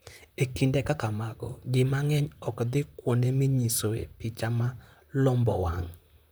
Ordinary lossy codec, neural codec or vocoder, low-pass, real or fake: none; vocoder, 44.1 kHz, 128 mel bands, Pupu-Vocoder; none; fake